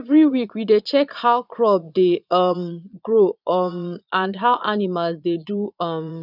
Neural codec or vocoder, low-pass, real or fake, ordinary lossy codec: vocoder, 22.05 kHz, 80 mel bands, Vocos; 5.4 kHz; fake; none